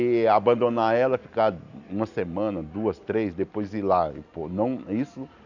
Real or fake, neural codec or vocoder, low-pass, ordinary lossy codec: real; none; 7.2 kHz; none